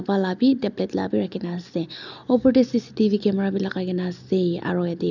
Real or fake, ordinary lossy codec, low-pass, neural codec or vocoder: real; none; 7.2 kHz; none